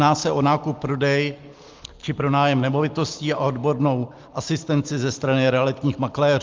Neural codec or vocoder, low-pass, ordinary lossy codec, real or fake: none; 7.2 kHz; Opus, 32 kbps; real